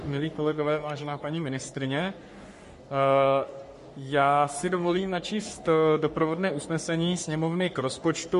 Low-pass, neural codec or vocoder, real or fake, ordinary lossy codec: 14.4 kHz; codec, 44.1 kHz, 3.4 kbps, Pupu-Codec; fake; MP3, 48 kbps